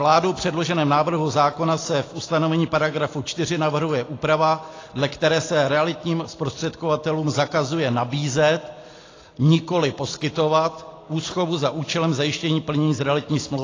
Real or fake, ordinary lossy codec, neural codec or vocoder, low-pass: real; AAC, 32 kbps; none; 7.2 kHz